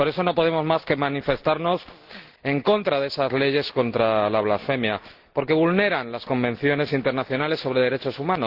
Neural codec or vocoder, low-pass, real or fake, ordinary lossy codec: none; 5.4 kHz; real; Opus, 16 kbps